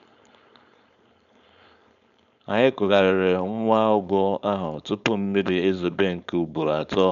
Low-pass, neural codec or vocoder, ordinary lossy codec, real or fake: 7.2 kHz; codec, 16 kHz, 4.8 kbps, FACodec; none; fake